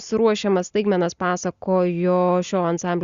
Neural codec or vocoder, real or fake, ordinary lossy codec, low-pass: none; real; Opus, 64 kbps; 7.2 kHz